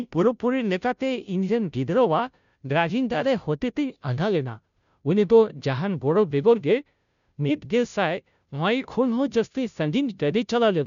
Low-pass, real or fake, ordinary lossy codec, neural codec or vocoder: 7.2 kHz; fake; none; codec, 16 kHz, 0.5 kbps, FunCodec, trained on Chinese and English, 25 frames a second